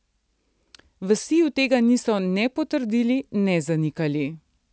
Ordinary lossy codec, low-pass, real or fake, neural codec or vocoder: none; none; real; none